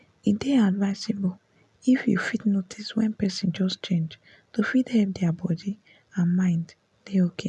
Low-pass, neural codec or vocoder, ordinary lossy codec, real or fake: none; none; none; real